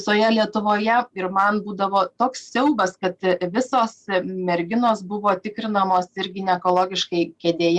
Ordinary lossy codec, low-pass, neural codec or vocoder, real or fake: Opus, 64 kbps; 10.8 kHz; none; real